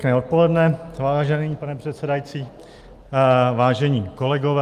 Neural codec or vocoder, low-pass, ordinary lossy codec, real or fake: none; 14.4 kHz; Opus, 32 kbps; real